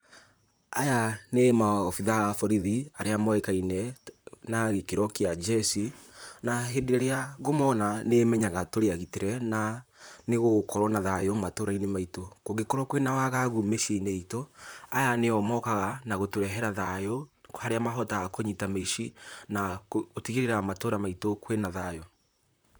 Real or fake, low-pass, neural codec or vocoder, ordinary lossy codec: fake; none; vocoder, 44.1 kHz, 128 mel bands, Pupu-Vocoder; none